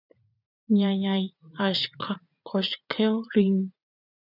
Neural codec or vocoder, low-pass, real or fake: vocoder, 44.1 kHz, 80 mel bands, Vocos; 5.4 kHz; fake